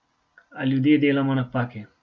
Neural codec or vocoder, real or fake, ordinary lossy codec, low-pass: none; real; none; none